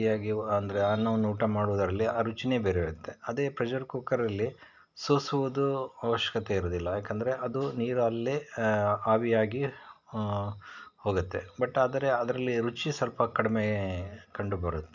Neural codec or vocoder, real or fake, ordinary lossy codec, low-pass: none; real; none; 7.2 kHz